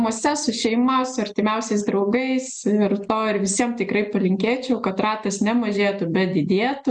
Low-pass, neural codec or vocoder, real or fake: 10.8 kHz; none; real